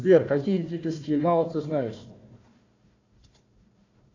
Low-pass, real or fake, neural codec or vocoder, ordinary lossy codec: 7.2 kHz; fake; codec, 16 kHz, 1 kbps, FunCodec, trained on Chinese and English, 50 frames a second; AAC, 48 kbps